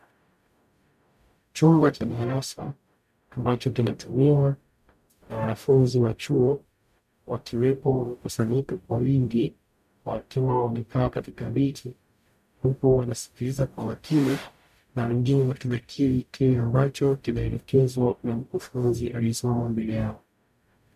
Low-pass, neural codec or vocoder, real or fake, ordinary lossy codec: 14.4 kHz; codec, 44.1 kHz, 0.9 kbps, DAC; fake; MP3, 96 kbps